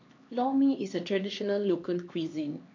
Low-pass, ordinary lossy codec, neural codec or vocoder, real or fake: 7.2 kHz; MP3, 48 kbps; codec, 16 kHz, 2 kbps, X-Codec, HuBERT features, trained on LibriSpeech; fake